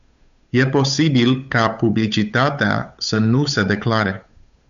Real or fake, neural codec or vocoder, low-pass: fake; codec, 16 kHz, 8 kbps, FunCodec, trained on Chinese and English, 25 frames a second; 7.2 kHz